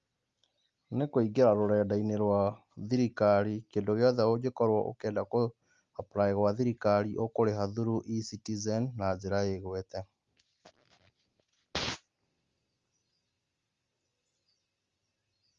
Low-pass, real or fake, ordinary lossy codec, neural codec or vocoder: 7.2 kHz; real; Opus, 32 kbps; none